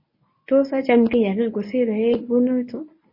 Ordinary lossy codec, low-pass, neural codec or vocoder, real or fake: MP3, 24 kbps; 5.4 kHz; codec, 24 kHz, 0.9 kbps, WavTokenizer, medium speech release version 1; fake